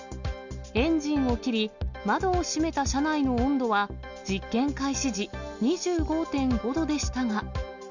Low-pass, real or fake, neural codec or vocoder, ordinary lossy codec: 7.2 kHz; real; none; none